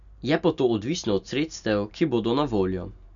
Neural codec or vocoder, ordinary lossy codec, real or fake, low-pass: none; none; real; 7.2 kHz